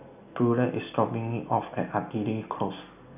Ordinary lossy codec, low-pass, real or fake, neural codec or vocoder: none; 3.6 kHz; real; none